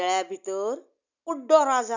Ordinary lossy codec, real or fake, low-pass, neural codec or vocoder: none; real; 7.2 kHz; none